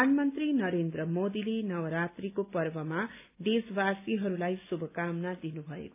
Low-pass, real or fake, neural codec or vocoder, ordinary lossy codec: 3.6 kHz; real; none; AAC, 32 kbps